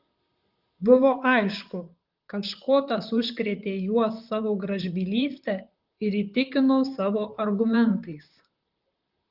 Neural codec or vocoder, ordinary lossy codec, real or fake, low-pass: vocoder, 44.1 kHz, 128 mel bands, Pupu-Vocoder; Opus, 32 kbps; fake; 5.4 kHz